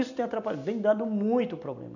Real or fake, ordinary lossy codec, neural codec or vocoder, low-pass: real; none; none; 7.2 kHz